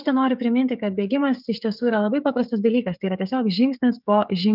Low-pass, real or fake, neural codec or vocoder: 5.4 kHz; fake; codec, 16 kHz, 16 kbps, FreqCodec, smaller model